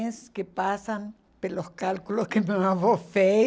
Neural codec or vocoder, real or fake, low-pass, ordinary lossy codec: none; real; none; none